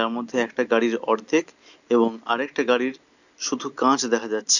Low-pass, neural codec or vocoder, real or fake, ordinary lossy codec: 7.2 kHz; none; real; none